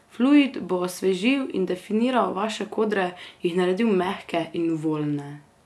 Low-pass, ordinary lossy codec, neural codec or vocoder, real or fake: none; none; none; real